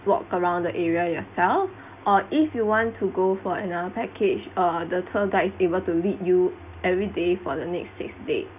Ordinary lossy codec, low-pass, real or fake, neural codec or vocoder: none; 3.6 kHz; real; none